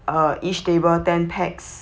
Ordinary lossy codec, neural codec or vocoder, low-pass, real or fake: none; none; none; real